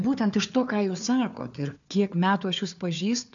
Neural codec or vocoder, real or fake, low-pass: codec, 16 kHz, 4 kbps, FunCodec, trained on Chinese and English, 50 frames a second; fake; 7.2 kHz